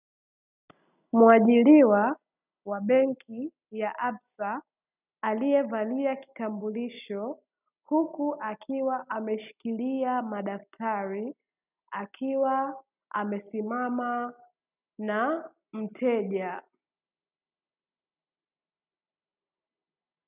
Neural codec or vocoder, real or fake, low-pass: none; real; 3.6 kHz